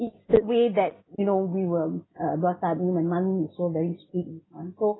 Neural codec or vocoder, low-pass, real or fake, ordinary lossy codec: none; 7.2 kHz; real; AAC, 16 kbps